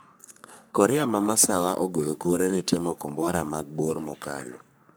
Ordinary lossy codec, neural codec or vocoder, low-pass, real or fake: none; codec, 44.1 kHz, 3.4 kbps, Pupu-Codec; none; fake